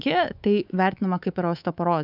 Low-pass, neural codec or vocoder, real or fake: 5.4 kHz; codec, 24 kHz, 3.1 kbps, DualCodec; fake